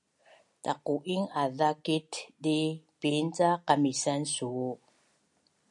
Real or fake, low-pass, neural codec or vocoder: real; 10.8 kHz; none